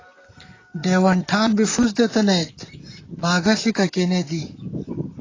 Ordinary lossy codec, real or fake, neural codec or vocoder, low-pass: AAC, 32 kbps; fake; vocoder, 44.1 kHz, 128 mel bands, Pupu-Vocoder; 7.2 kHz